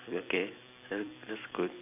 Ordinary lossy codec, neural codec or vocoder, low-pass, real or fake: AAC, 32 kbps; vocoder, 44.1 kHz, 128 mel bands every 256 samples, BigVGAN v2; 3.6 kHz; fake